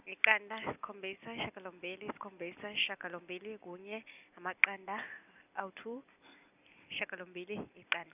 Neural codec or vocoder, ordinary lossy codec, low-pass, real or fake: none; none; 3.6 kHz; real